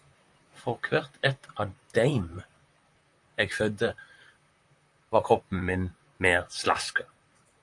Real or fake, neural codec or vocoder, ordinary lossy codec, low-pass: fake; vocoder, 44.1 kHz, 128 mel bands, Pupu-Vocoder; AAC, 64 kbps; 10.8 kHz